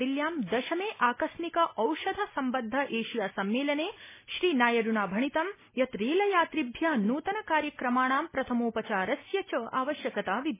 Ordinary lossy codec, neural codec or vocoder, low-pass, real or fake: MP3, 16 kbps; none; 3.6 kHz; real